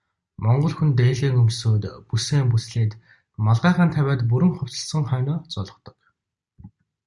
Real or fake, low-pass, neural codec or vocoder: real; 10.8 kHz; none